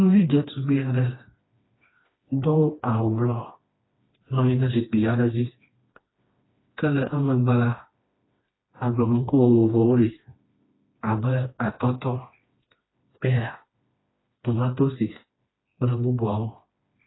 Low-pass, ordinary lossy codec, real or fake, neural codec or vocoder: 7.2 kHz; AAC, 16 kbps; fake; codec, 16 kHz, 2 kbps, FreqCodec, smaller model